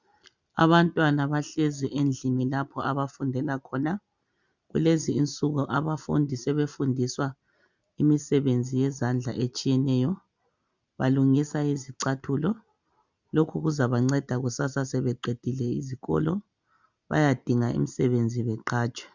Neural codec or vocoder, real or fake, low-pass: none; real; 7.2 kHz